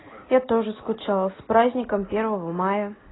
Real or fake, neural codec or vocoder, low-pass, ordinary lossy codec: real; none; 7.2 kHz; AAC, 16 kbps